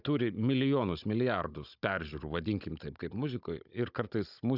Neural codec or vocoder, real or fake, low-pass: codec, 16 kHz, 16 kbps, FunCodec, trained on Chinese and English, 50 frames a second; fake; 5.4 kHz